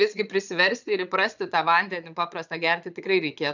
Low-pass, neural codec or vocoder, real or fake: 7.2 kHz; vocoder, 22.05 kHz, 80 mel bands, Vocos; fake